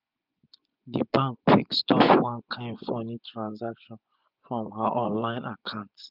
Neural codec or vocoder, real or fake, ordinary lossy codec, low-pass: vocoder, 24 kHz, 100 mel bands, Vocos; fake; none; 5.4 kHz